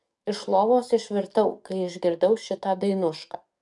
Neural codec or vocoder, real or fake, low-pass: codec, 44.1 kHz, 7.8 kbps, DAC; fake; 10.8 kHz